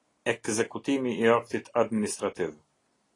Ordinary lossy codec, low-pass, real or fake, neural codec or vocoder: AAC, 32 kbps; 10.8 kHz; real; none